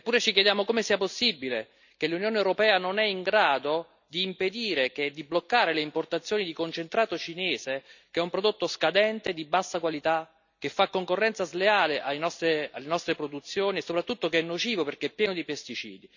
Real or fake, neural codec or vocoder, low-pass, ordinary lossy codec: real; none; 7.2 kHz; none